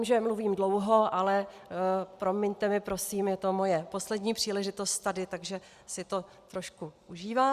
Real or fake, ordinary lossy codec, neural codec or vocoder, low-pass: real; Opus, 64 kbps; none; 14.4 kHz